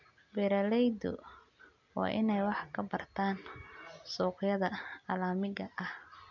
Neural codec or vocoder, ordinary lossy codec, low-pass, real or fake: none; none; 7.2 kHz; real